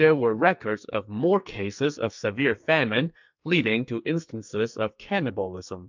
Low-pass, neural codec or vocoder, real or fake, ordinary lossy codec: 7.2 kHz; codec, 44.1 kHz, 2.6 kbps, SNAC; fake; MP3, 64 kbps